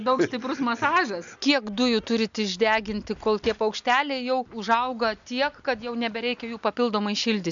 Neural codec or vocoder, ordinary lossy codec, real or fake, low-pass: none; MP3, 64 kbps; real; 7.2 kHz